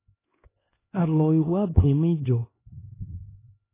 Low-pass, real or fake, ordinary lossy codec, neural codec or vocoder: 3.6 kHz; fake; AAC, 16 kbps; codec, 16 kHz, 2 kbps, X-Codec, HuBERT features, trained on LibriSpeech